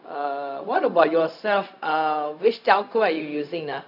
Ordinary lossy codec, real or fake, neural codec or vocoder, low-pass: none; fake; codec, 16 kHz, 0.4 kbps, LongCat-Audio-Codec; 5.4 kHz